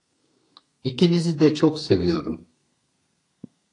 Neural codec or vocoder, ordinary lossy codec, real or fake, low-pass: codec, 32 kHz, 1.9 kbps, SNAC; AAC, 48 kbps; fake; 10.8 kHz